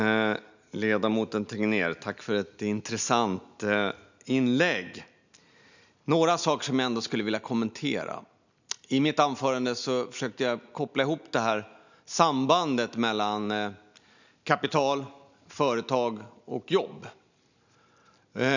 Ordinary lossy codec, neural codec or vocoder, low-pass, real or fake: none; none; 7.2 kHz; real